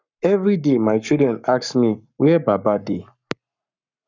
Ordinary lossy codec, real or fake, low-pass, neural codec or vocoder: none; fake; 7.2 kHz; codec, 44.1 kHz, 7.8 kbps, Pupu-Codec